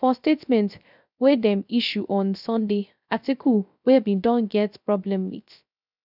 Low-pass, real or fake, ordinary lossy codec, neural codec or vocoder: 5.4 kHz; fake; none; codec, 16 kHz, 0.3 kbps, FocalCodec